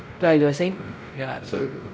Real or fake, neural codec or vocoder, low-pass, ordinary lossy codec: fake; codec, 16 kHz, 0.5 kbps, X-Codec, WavLM features, trained on Multilingual LibriSpeech; none; none